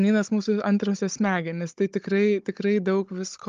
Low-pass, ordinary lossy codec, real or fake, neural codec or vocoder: 7.2 kHz; Opus, 32 kbps; fake; codec, 16 kHz, 16 kbps, FunCodec, trained on Chinese and English, 50 frames a second